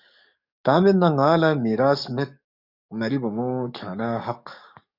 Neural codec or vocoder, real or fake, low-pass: codec, 44.1 kHz, 7.8 kbps, DAC; fake; 5.4 kHz